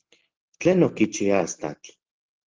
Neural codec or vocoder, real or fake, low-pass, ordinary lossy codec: none; real; 7.2 kHz; Opus, 16 kbps